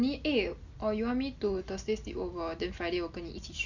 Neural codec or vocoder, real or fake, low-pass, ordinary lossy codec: none; real; 7.2 kHz; Opus, 64 kbps